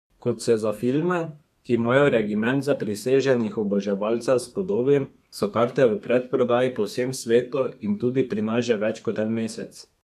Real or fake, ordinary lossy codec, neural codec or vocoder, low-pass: fake; none; codec, 32 kHz, 1.9 kbps, SNAC; 14.4 kHz